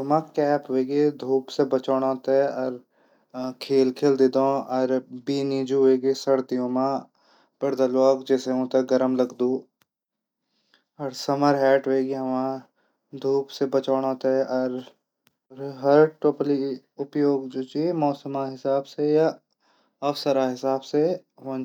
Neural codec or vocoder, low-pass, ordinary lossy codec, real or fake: none; 19.8 kHz; none; real